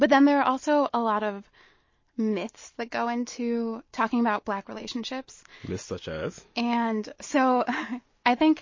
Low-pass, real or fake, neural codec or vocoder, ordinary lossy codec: 7.2 kHz; real; none; MP3, 32 kbps